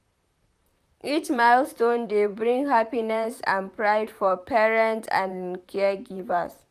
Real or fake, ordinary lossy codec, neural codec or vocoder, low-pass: fake; none; vocoder, 44.1 kHz, 128 mel bands, Pupu-Vocoder; 14.4 kHz